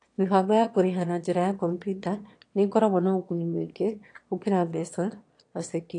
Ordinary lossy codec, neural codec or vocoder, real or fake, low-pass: AAC, 48 kbps; autoencoder, 22.05 kHz, a latent of 192 numbers a frame, VITS, trained on one speaker; fake; 9.9 kHz